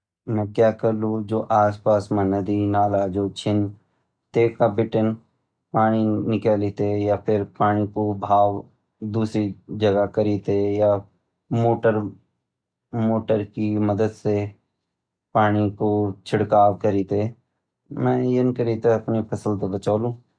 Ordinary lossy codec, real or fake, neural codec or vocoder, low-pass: none; real; none; 9.9 kHz